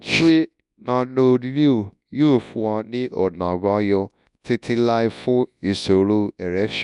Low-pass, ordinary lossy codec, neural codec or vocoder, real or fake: 10.8 kHz; none; codec, 24 kHz, 0.9 kbps, WavTokenizer, large speech release; fake